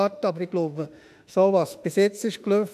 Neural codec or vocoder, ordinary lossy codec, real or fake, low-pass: autoencoder, 48 kHz, 32 numbers a frame, DAC-VAE, trained on Japanese speech; none; fake; 14.4 kHz